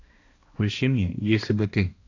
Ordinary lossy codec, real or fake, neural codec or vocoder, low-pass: AAC, 32 kbps; fake; codec, 16 kHz, 1 kbps, X-Codec, HuBERT features, trained on balanced general audio; 7.2 kHz